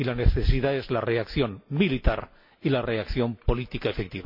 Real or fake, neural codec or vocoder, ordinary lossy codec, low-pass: real; none; MP3, 32 kbps; 5.4 kHz